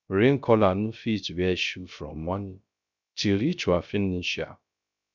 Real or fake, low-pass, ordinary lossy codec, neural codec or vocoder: fake; 7.2 kHz; none; codec, 16 kHz, 0.3 kbps, FocalCodec